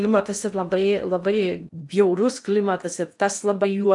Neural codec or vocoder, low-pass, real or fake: codec, 16 kHz in and 24 kHz out, 0.6 kbps, FocalCodec, streaming, 2048 codes; 10.8 kHz; fake